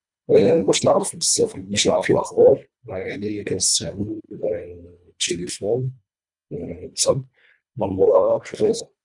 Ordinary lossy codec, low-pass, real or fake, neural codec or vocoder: none; 10.8 kHz; fake; codec, 24 kHz, 1.5 kbps, HILCodec